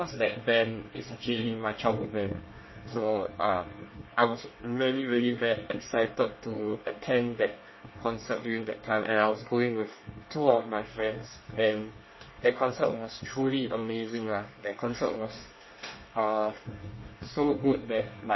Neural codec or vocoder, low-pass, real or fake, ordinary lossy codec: codec, 24 kHz, 1 kbps, SNAC; 7.2 kHz; fake; MP3, 24 kbps